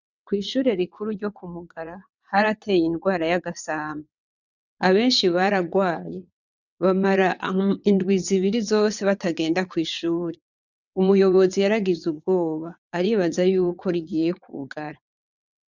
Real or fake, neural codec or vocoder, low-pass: fake; vocoder, 22.05 kHz, 80 mel bands, WaveNeXt; 7.2 kHz